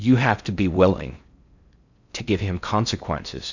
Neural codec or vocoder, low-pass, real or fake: codec, 16 kHz in and 24 kHz out, 0.6 kbps, FocalCodec, streaming, 4096 codes; 7.2 kHz; fake